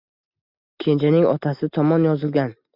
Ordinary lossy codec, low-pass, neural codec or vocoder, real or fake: MP3, 48 kbps; 5.4 kHz; none; real